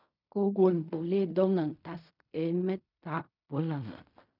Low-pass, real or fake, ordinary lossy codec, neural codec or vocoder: 5.4 kHz; fake; none; codec, 16 kHz in and 24 kHz out, 0.4 kbps, LongCat-Audio-Codec, fine tuned four codebook decoder